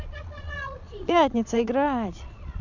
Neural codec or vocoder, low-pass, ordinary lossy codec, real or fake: vocoder, 22.05 kHz, 80 mel bands, Vocos; 7.2 kHz; none; fake